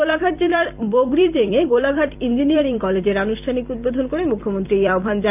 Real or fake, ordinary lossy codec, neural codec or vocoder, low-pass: fake; none; vocoder, 44.1 kHz, 128 mel bands every 256 samples, BigVGAN v2; 3.6 kHz